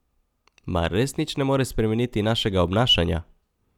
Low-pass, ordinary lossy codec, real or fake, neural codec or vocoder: 19.8 kHz; none; real; none